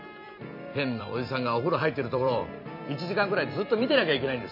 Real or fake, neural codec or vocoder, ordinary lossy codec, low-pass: fake; vocoder, 44.1 kHz, 128 mel bands every 256 samples, BigVGAN v2; none; 5.4 kHz